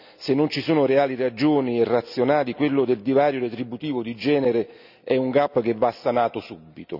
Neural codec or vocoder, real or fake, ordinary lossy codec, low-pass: none; real; none; 5.4 kHz